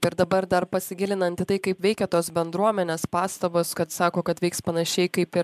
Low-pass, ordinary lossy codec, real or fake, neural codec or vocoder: 14.4 kHz; MP3, 96 kbps; fake; vocoder, 44.1 kHz, 128 mel bands every 512 samples, BigVGAN v2